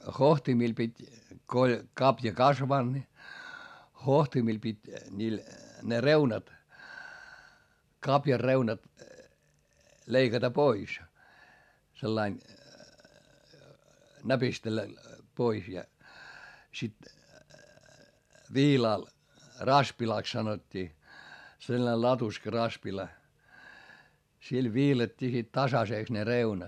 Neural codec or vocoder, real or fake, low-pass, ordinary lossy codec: none; real; 14.4 kHz; MP3, 96 kbps